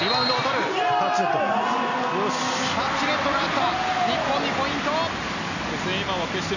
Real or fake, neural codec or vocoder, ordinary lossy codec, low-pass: real; none; none; 7.2 kHz